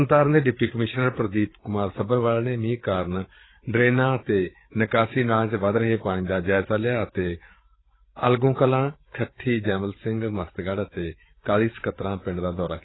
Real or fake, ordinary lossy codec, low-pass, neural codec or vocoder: fake; AAC, 16 kbps; 7.2 kHz; codec, 16 kHz, 8 kbps, FreqCodec, larger model